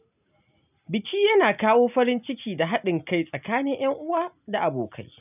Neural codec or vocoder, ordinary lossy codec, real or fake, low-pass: none; none; real; 3.6 kHz